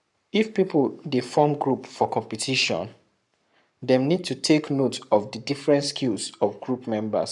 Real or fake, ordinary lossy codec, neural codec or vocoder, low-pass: fake; none; codec, 44.1 kHz, 7.8 kbps, Pupu-Codec; 10.8 kHz